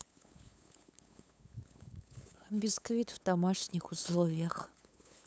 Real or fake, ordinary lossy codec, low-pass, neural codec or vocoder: fake; none; none; codec, 16 kHz, 8 kbps, FunCodec, trained on LibriTTS, 25 frames a second